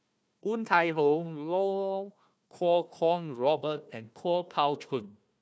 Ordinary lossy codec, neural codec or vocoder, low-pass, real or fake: none; codec, 16 kHz, 1 kbps, FunCodec, trained on Chinese and English, 50 frames a second; none; fake